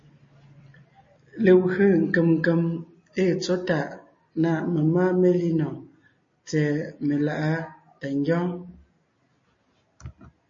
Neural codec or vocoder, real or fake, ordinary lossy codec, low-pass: none; real; MP3, 32 kbps; 7.2 kHz